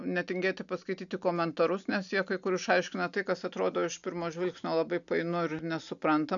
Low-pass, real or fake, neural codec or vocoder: 7.2 kHz; real; none